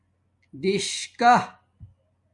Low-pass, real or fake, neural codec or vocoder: 10.8 kHz; real; none